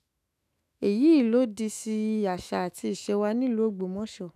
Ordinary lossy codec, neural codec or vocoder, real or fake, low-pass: none; autoencoder, 48 kHz, 128 numbers a frame, DAC-VAE, trained on Japanese speech; fake; 14.4 kHz